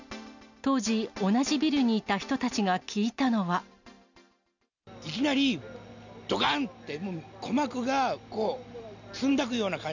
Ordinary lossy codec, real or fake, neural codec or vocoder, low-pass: none; real; none; 7.2 kHz